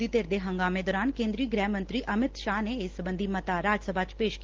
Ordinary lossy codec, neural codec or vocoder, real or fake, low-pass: Opus, 16 kbps; none; real; 7.2 kHz